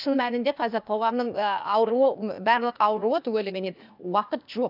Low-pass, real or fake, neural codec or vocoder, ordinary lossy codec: 5.4 kHz; fake; codec, 16 kHz, 0.8 kbps, ZipCodec; none